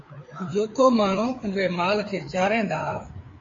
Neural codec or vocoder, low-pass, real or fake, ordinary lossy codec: codec, 16 kHz, 4 kbps, FreqCodec, larger model; 7.2 kHz; fake; AAC, 32 kbps